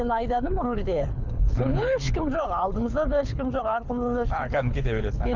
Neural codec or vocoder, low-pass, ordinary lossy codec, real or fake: codec, 24 kHz, 6 kbps, HILCodec; 7.2 kHz; none; fake